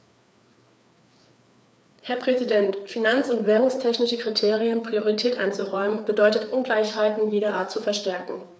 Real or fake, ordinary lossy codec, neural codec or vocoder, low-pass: fake; none; codec, 16 kHz, 4 kbps, FreqCodec, larger model; none